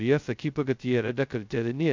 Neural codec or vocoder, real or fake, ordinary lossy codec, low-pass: codec, 16 kHz, 0.2 kbps, FocalCodec; fake; MP3, 64 kbps; 7.2 kHz